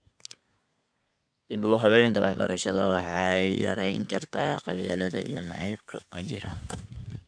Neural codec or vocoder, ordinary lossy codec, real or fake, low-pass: codec, 24 kHz, 1 kbps, SNAC; none; fake; 9.9 kHz